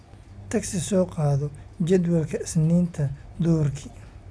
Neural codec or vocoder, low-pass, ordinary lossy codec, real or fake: none; none; none; real